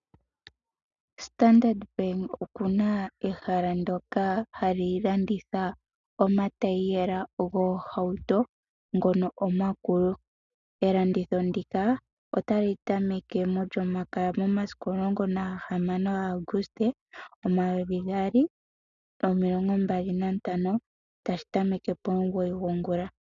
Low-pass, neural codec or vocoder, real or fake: 7.2 kHz; none; real